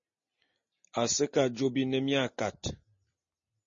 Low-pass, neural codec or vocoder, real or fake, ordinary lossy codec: 7.2 kHz; none; real; MP3, 32 kbps